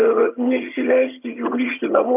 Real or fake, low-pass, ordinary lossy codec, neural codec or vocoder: fake; 3.6 kHz; MP3, 32 kbps; vocoder, 22.05 kHz, 80 mel bands, HiFi-GAN